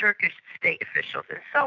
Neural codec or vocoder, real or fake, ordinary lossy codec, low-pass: autoencoder, 48 kHz, 128 numbers a frame, DAC-VAE, trained on Japanese speech; fake; AAC, 48 kbps; 7.2 kHz